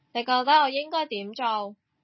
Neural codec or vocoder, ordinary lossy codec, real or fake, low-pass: none; MP3, 24 kbps; real; 7.2 kHz